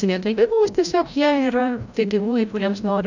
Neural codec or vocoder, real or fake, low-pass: codec, 16 kHz, 0.5 kbps, FreqCodec, larger model; fake; 7.2 kHz